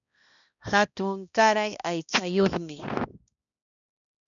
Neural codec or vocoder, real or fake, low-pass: codec, 16 kHz, 1 kbps, X-Codec, HuBERT features, trained on balanced general audio; fake; 7.2 kHz